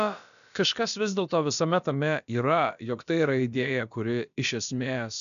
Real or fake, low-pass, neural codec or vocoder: fake; 7.2 kHz; codec, 16 kHz, about 1 kbps, DyCAST, with the encoder's durations